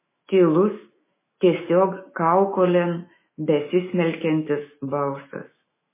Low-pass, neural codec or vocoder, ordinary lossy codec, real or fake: 3.6 kHz; autoencoder, 48 kHz, 128 numbers a frame, DAC-VAE, trained on Japanese speech; MP3, 16 kbps; fake